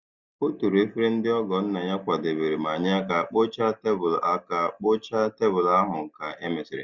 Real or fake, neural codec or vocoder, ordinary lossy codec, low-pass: real; none; Opus, 64 kbps; 7.2 kHz